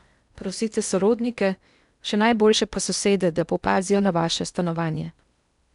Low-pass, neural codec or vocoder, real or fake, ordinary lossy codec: 10.8 kHz; codec, 16 kHz in and 24 kHz out, 0.8 kbps, FocalCodec, streaming, 65536 codes; fake; none